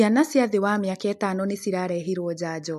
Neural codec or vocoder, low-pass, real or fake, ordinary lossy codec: none; 14.4 kHz; real; MP3, 64 kbps